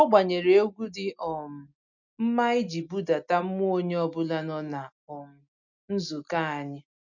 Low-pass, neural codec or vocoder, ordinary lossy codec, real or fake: 7.2 kHz; none; none; real